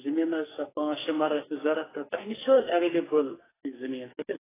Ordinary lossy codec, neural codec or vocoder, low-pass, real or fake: AAC, 16 kbps; codec, 44.1 kHz, 2.6 kbps, SNAC; 3.6 kHz; fake